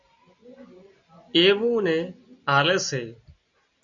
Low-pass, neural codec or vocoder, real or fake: 7.2 kHz; none; real